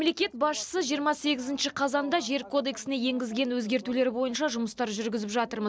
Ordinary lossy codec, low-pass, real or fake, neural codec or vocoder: none; none; real; none